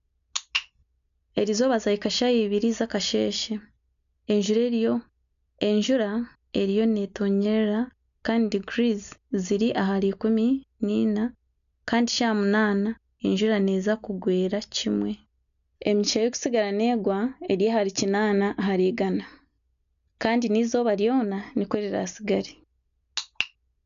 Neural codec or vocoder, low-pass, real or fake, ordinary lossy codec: none; 7.2 kHz; real; none